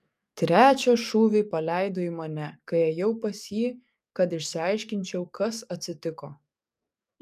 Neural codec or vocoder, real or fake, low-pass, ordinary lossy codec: codec, 44.1 kHz, 7.8 kbps, DAC; fake; 14.4 kHz; MP3, 96 kbps